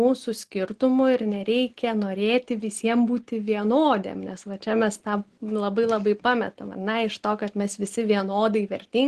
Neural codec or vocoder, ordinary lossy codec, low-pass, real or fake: none; Opus, 16 kbps; 10.8 kHz; real